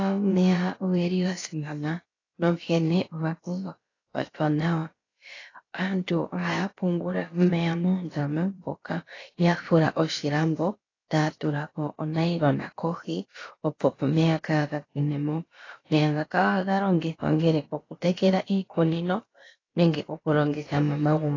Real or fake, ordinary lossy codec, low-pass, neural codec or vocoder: fake; AAC, 32 kbps; 7.2 kHz; codec, 16 kHz, about 1 kbps, DyCAST, with the encoder's durations